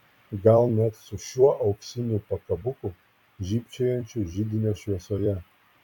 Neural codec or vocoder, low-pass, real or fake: vocoder, 44.1 kHz, 128 mel bands every 256 samples, BigVGAN v2; 19.8 kHz; fake